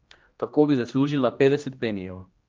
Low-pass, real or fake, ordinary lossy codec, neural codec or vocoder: 7.2 kHz; fake; Opus, 24 kbps; codec, 16 kHz, 1 kbps, X-Codec, HuBERT features, trained on general audio